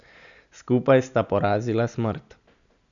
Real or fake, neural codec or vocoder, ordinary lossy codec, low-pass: real; none; none; 7.2 kHz